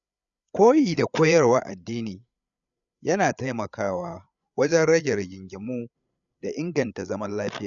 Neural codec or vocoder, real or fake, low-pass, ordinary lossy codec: codec, 16 kHz, 8 kbps, FreqCodec, larger model; fake; 7.2 kHz; none